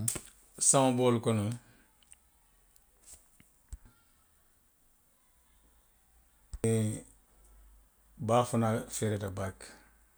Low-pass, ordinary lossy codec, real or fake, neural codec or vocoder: none; none; real; none